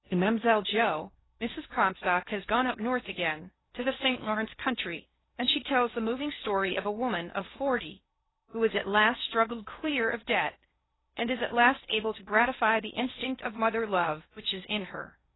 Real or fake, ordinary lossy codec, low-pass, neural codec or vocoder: fake; AAC, 16 kbps; 7.2 kHz; codec, 16 kHz in and 24 kHz out, 0.8 kbps, FocalCodec, streaming, 65536 codes